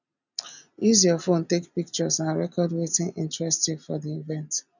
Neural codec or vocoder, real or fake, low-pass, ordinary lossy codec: none; real; 7.2 kHz; none